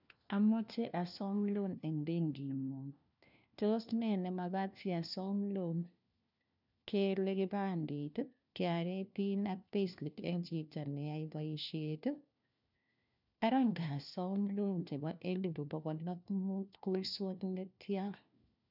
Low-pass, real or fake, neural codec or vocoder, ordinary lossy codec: 5.4 kHz; fake; codec, 16 kHz, 1 kbps, FunCodec, trained on LibriTTS, 50 frames a second; none